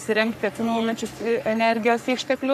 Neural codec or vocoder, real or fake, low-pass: codec, 44.1 kHz, 3.4 kbps, Pupu-Codec; fake; 14.4 kHz